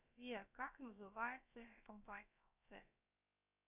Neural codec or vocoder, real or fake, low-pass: codec, 16 kHz, about 1 kbps, DyCAST, with the encoder's durations; fake; 3.6 kHz